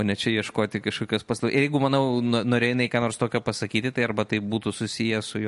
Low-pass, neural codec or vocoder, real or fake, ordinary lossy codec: 14.4 kHz; none; real; MP3, 48 kbps